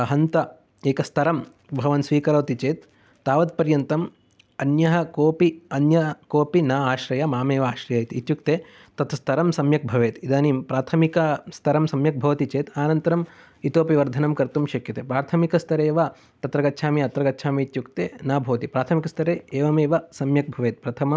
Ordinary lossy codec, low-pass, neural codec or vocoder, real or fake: none; none; none; real